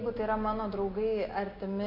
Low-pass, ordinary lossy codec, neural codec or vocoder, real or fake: 5.4 kHz; MP3, 32 kbps; none; real